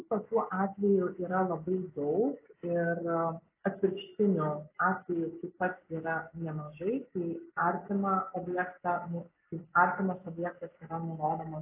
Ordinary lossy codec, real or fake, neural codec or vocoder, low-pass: AAC, 24 kbps; real; none; 3.6 kHz